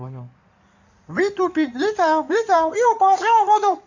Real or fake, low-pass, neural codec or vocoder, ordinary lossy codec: fake; 7.2 kHz; codec, 16 kHz in and 24 kHz out, 2.2 kbps, FireRedTTS-2 codec; none